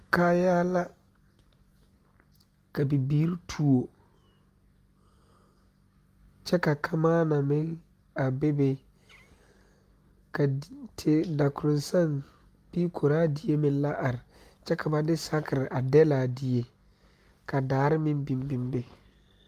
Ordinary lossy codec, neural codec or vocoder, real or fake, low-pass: Opus, 32 kbps; none; real; 14.4 kHz